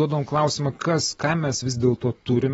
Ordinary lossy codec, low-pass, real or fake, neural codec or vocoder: AAC, 24 kbps; 19.8 kHz; real; none